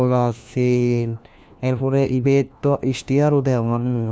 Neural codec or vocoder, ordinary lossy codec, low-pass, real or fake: codec, 16 kHz, 1 kbps, FunCodec, trained on LibriTTS, 50 frames a second; none; none; fake